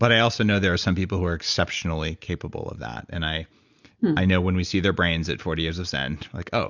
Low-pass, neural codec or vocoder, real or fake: 7.2 kHz; none; real